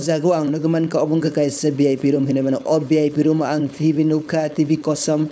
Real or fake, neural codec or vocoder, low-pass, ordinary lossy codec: fake; codec, 16 kHz, 4.8 kbps, FACodec; none; none